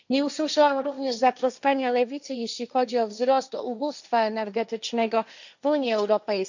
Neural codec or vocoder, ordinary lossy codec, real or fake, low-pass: codec, 16 kHz, 1.1 kbps, Voila-Tokenizer; none; fake; 7.2 kHz